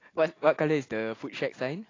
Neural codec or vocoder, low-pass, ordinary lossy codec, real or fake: none; 7.2 kHz; AAC, 32 kbps; real